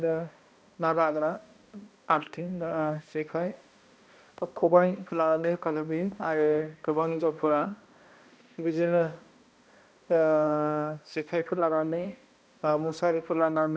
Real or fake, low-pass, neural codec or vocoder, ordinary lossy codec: fake; none; codec, 16 kHz, 1 kbps, X-Codec, HuBERT features, trained on balanced general audio; none